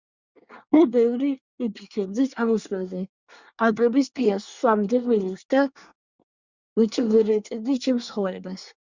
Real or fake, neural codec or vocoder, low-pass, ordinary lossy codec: fake; codec, 24 kHz, 1 kbps, SNAC; 7.2 kHz; Opus, 64 kbps